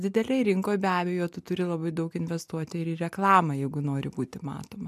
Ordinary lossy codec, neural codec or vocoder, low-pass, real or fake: MP3, 96 kbps; none; 14.4 kHz; real